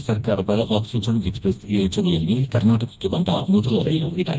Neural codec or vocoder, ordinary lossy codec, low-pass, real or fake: codec, 16 kHz, 1 kbps, FreqCodec, smaller model; none; none; fake